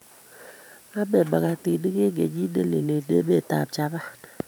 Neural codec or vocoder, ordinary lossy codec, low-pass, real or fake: vocoder, 44.1 kHz, 128 mel bands every 512 samples, BigVGAN v2; none; none; fake